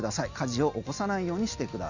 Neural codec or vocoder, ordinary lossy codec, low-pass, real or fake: none; none; 7.2 kHz; real